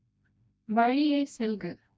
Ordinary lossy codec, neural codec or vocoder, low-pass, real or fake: none; codec, 16 kHz, 1 kbps, FreqCodec, smaller model; none; fake